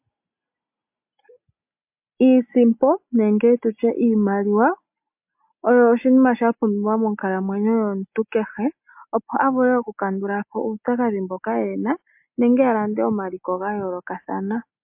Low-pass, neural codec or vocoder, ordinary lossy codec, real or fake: 3.6 kHz; none; MP3, 32 kbps; real